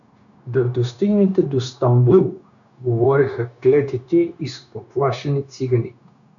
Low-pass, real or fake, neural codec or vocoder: 7.2 kHz; fake; codec, 16 kHz, 0.9 kbps, LongCat-Audio-Codec